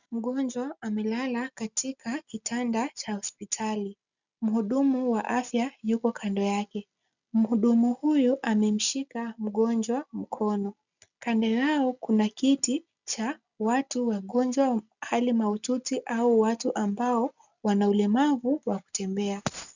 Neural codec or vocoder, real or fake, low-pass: none; real; 7.2 kHz